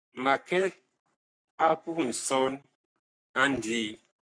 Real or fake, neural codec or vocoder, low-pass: fake; codec, 44.1 kHz, 3.4 kbps, Pupu-Codec; 9.9 kHz